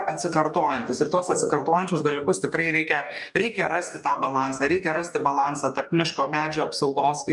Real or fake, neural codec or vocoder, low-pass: fake; codec, 44.1 kHz, 2.6 kbps, DAC; 10.8 kHz